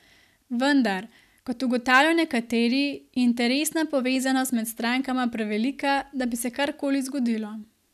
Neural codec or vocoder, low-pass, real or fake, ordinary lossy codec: none; 14.4 kHz; real; none